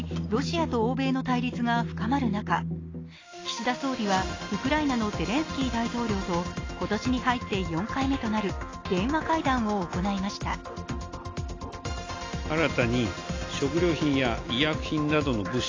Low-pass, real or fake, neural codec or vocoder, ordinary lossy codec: 7.2 kHz; real; none; AAC, 32 kbps